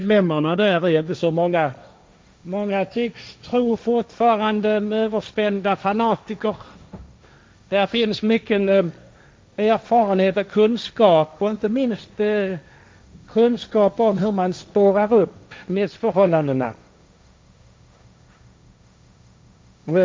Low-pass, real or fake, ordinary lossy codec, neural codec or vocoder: none; fake; none; codec, 16 kHz, 1.1 kbps, Voila-Tokenizer